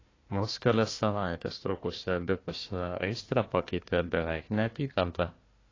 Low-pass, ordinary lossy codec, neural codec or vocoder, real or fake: 7.2 kHz; AAC, 32 kbps; codec, 16 kHz, 1 kbps, FunCodec, trained on Chinese and English, 50 frames a second; fake